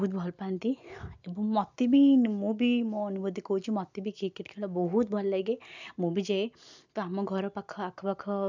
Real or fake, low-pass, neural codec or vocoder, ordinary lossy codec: real; 7.2 kHz; none; none